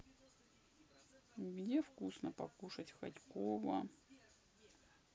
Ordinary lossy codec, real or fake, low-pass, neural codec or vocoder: none; real; none; none